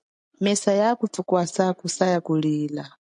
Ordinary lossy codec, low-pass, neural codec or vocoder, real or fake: MP3, 64 kbps; 9.9 kHz; none; real